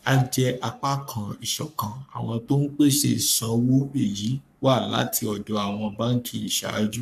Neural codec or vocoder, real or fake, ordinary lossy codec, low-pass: codec, 44.1 kHz, 3.4 kbps, Pupu-Codec; fake; none; 14.4 kHz